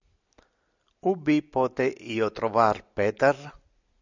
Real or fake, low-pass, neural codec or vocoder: real; 7.2 kHz; none